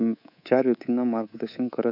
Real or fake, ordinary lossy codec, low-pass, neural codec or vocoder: fake; none; 5.4 kHz; codec, 24 kHz, 3.1 kbps, DualCodec